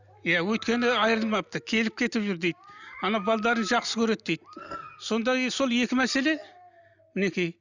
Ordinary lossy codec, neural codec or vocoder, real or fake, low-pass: none; none; real; 7.2 kHz